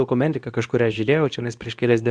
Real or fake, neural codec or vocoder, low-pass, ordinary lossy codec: fake; codec, 24 kHz, 0.9 kbps, WavTokenizer, medium speech release version 2; 9.9 kHz; Opus, 64 kbps